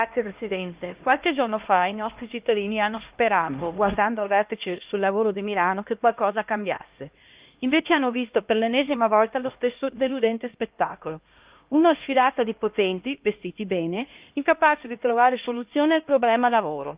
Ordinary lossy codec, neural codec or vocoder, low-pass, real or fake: Opus, 32 kbps; codec, 16 kHz, 1 kbps, X-Codec, HuBERT features, trained on LibriSpeech; 3.6 kHz; fake